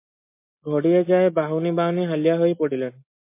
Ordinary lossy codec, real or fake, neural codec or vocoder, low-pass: MP3, 24 kbps; real; none; 3.6 kHz